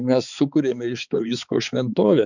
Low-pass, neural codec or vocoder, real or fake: 7.2 kHz; codec, 16 kHz, 4 kbps, X-Codec, HuBERT features, trained on balanced general audio; fake